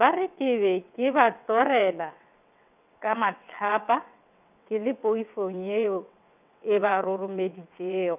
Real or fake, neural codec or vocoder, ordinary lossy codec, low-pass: fake; vocoder, 22.05 kHz, 80 mel bands, WaveNeXt; none; 3.6 kHz